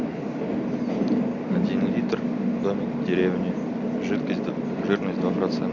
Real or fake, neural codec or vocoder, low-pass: real; none; 7.2 kHz